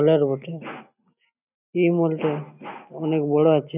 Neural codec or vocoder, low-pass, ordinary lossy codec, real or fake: none; 3.6 kHz; none; real